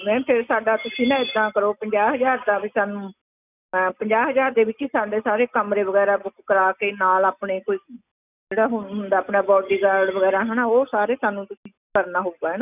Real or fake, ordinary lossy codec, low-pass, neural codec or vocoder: real; none; 3.6 kHz; none